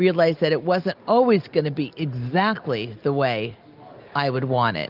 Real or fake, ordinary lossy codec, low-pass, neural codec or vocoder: real; Opus, 24 kbps; 5.4 kHz; none